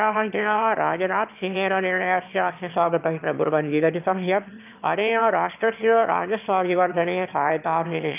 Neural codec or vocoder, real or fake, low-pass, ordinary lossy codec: autoencoder, 22.05 kHz, a latent of 192 numbers a frame, VITS, trained on one speaker; fake; 3.6 kHz; none